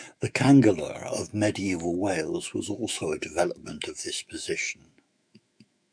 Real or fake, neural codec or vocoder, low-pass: fake; autoencoder, 48 kHz, 128 numbers a frame, DAC-VAE, trained on Japanese speech; 9.9 kHz